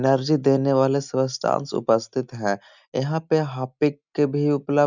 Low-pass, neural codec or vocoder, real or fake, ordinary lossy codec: 7.2 kHz; none; real; none